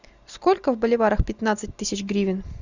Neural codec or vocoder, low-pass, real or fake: none; 7.2 kHz; real